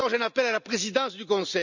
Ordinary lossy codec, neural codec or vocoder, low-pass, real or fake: none; none; 7.2 kHz; real